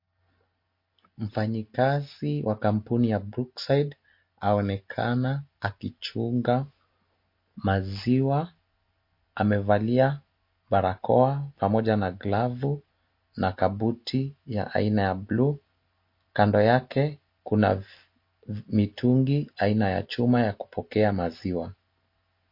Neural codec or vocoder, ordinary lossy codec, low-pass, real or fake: none; MP3, 32 kbps; 5.4 kHz; real